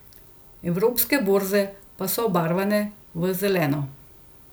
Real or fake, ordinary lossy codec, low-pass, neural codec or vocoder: real; none; none; none